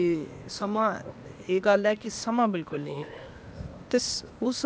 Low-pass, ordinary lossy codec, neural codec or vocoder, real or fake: none; none; codec, 16 kHz, 0.8 kbps, ZipCodec; fake